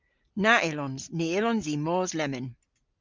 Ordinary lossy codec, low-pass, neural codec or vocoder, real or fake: Opus, 24 kbps; 7.2 kHz; none; real